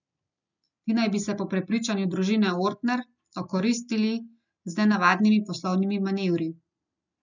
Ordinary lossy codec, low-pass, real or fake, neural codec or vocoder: none; 7.2 kHz; real; none